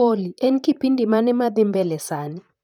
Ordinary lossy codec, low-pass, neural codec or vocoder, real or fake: none; 19.8 kHz; vocoder, 44.1 kHz, 128 mel bands, Pupu-Vocoder; fake